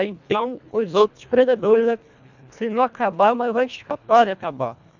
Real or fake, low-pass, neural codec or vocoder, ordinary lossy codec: fake; 7.2 kHz; codec, 24 kHz, 1.5 kbps, HILCodec; none